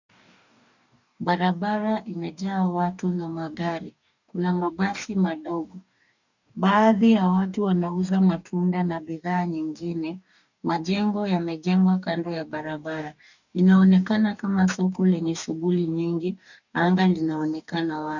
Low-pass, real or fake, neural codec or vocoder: 7.2 kHz; fake; codec, 44.1 kHz, 2.6 kbps, DAC